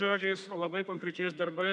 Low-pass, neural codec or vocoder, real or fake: 10.8 kHz; codec, 32 kHz, 1.9 kbps, SNAC; fake